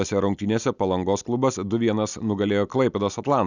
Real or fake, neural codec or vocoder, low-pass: real; none; 7.2 kHz